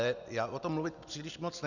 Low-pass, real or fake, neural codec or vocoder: 7.2 kHz; real; none